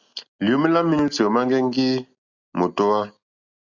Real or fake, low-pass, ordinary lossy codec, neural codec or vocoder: real; 7.2 kHz; Opus, 64 kbps; none